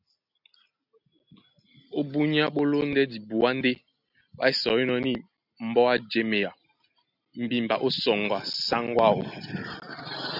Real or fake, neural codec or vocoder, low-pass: real; none; 5.4 kHz